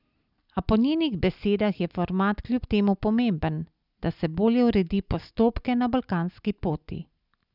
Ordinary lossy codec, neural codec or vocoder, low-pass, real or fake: none; none; 5.4 kHz; real